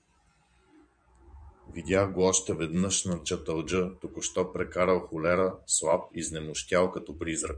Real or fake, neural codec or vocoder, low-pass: fake; vocoder, 24 kHz, 100 mel bands, Vocos; 9.9 kHz